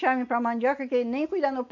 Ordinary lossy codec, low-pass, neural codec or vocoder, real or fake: none; 7.2 kHz; none; real